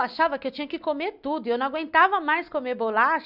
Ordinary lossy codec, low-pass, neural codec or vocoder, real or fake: none; 5.4 kHz; none; real